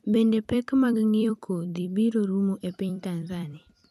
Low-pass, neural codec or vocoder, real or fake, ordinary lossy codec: 14.4 kHz; vocoder, 44.1 kHz, 128 mel bands every 256 samples, BigVGAN v2; fake; none